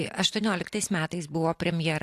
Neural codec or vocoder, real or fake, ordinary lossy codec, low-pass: none; real; AAC, 64 kbps; 14.4 kHz